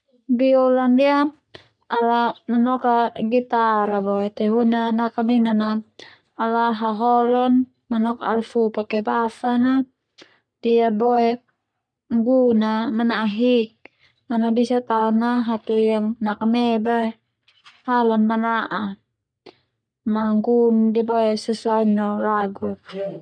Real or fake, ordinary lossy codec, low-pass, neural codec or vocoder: fake; none; 9.9 kHz; codec, 44.1 kHz, 3.4 kbps, Pupu-Codec